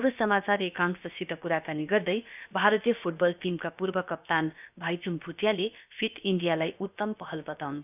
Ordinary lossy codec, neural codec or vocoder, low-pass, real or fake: none; codec, 16 kHz, about 1 kbps, DyCAST, with the encoder's durations; 3.6 kHz; fake